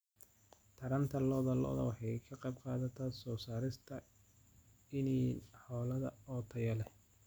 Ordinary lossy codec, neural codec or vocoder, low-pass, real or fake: none; none; none; real